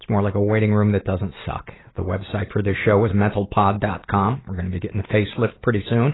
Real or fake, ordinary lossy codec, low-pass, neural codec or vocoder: real; AAC, 16 kbps; 7.2 kHz; none